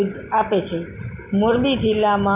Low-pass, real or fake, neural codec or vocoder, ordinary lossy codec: 3.6 kHz; real; none; none